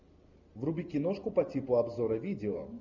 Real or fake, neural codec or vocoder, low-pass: real; none; 7.2 kHz